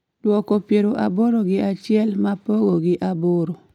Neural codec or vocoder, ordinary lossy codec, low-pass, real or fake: none; none; 19.8 kHz; real